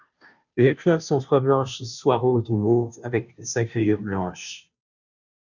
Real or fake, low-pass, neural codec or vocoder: fake; 7.2 kHz; codec, 16 kHz, 0.5 kbps, FunCodec, trained on Chinese and English, 25 frames a second